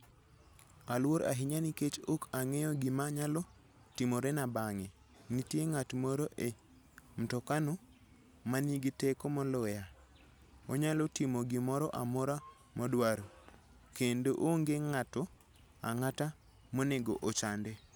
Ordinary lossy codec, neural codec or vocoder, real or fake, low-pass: none; none; real; none